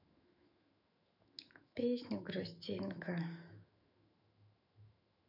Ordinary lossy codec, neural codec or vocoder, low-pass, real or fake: none; autoencoder, 48 kHz, 128 numbers a frame, DAC-VAE, trained on Japanese speech; 5.4 kHz; fake